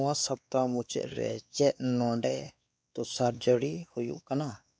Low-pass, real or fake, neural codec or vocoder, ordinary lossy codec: none; fake; codec, 16 kHz, 2 kbps, X-Codec, WavLM features, trained on Multilingual LibriSpeech; none